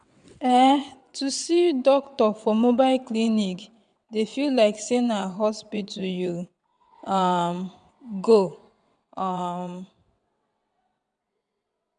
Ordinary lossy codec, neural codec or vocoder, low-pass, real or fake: none; vocoder, 22.05 kHz, 80 mel bands, WaveNeXt; 9.9 kHz; fake